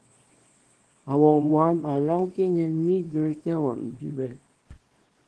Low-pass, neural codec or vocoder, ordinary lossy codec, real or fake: 10.8 kHz; codec, 24 kHz, 0.9 kbps, WavTokenizer, small release; Opus, 16 kbps; fake